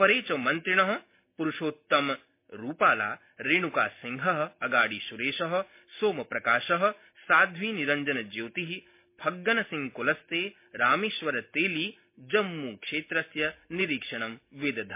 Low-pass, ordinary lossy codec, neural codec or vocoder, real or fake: 3.6 kHz; MP3, 24 kbps; none; real